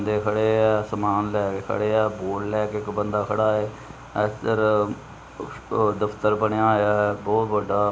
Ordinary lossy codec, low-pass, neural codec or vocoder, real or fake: none; none; none; real